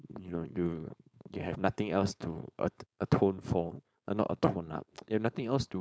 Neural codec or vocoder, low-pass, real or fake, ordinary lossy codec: codec, 16 kHz, 4.8 kbps, FACodec; none; fake; none